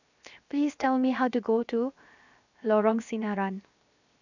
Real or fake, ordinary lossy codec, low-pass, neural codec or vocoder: fake; none; 7.2 kHz; codec, 16 kHz, 0.7 kbps, FocalCodec